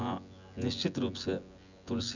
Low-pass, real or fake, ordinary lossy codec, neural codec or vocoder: 7.2 kHz; fake; none; vocoder, 24 kHz, 100 mel bands, Vocos